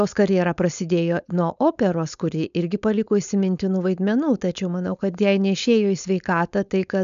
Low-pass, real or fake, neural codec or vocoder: 7.2 kHz; fake; codec, 16 kHz, 4.8 kbps, FACodec